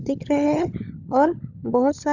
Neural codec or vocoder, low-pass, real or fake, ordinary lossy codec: codec, 16 kHz, 16 kbps, FunCodec, trained on LibriTTS, 50 frames a second; 7.2 kHz; fake; none